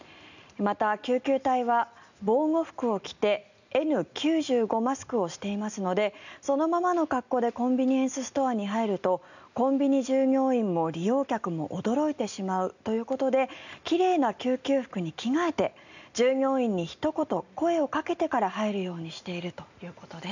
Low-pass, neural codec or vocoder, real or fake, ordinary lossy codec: 7.2 kHz; none; real; none